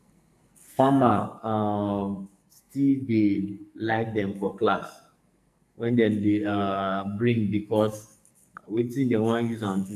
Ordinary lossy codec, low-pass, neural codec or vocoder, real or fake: AAC, 96 kbps; 14.4 kHz; codec, 44.1 kHz, 2.6 kbps, SNAC; fake